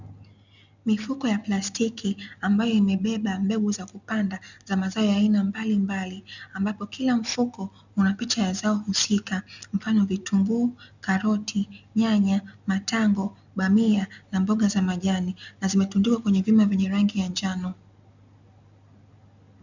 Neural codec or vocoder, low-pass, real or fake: none; 7.2 kHz; real